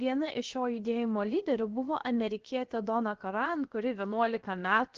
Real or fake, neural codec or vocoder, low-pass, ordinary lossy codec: fake; codec, 16 kHz, 0.7 kbps, FocalCodec; 7.2 kHz; Opus, 16 kbps